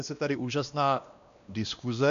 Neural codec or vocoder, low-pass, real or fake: codec, 16 kHz, 1 kbps, X-Codec, WavLM features, trained on Multilingual LibriSpeech; 7.2 kHz; fake